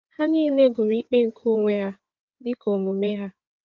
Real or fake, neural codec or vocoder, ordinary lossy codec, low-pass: fake; codec, 16 kHz in and 24 kHz out, 2.2 kbps, FireRedTTS-2 codec; Opus, 24 kbps; 7.2 kHz